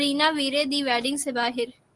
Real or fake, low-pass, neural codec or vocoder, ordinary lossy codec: real; 10.8 kHz; none; Opus, 24 kbps